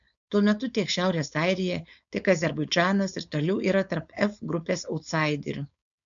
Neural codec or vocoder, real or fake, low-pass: codec, 16 kHz, 4.8 kbps, FACodec; fake; 7.2 kHz